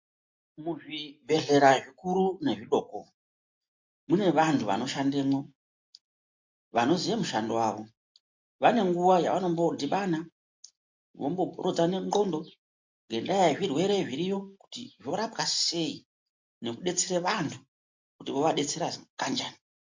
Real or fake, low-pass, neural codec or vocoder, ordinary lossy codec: real; 7.2 kHz; none; MP3, 48 kbps